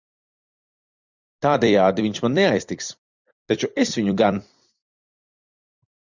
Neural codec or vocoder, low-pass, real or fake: vocoder, 44.1 kHz, 128 mel bands every 256 samples, BigVGAN v2; 7.2 kHz; fake